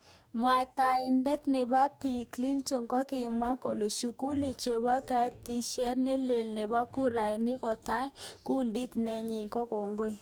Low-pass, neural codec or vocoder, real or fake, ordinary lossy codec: none; codec, 44.1 kHz, 2.6 kbps, DAC; fake; none